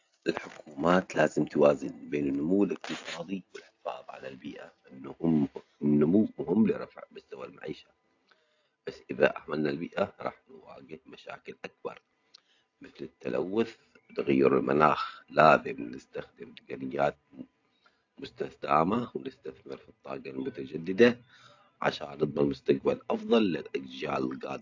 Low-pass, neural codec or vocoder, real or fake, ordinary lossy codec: 7.2 kHz; none; real; AAC, 48 kbps